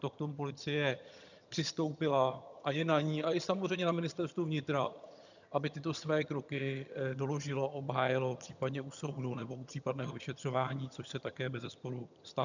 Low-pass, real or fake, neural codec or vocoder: 7.2 kHz; fake; vocoder, 22.05 kHz, 80 mel bands, HiFi-GAN